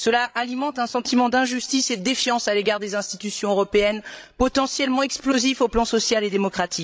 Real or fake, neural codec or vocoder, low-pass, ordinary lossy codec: fake; codec, 16 kHz, 16 kbps, FreqCodec, larger model; none; none